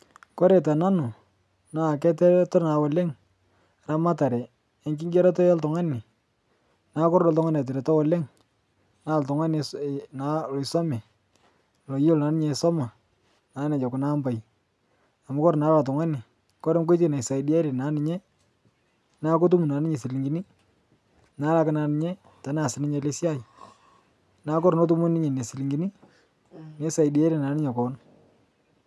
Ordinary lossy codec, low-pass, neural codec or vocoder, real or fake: none; none; none; real